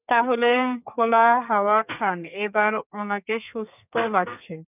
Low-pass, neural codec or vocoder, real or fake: 3.6 kHz; codec, 32 kHz, 1.9 kbps, SNAC; fake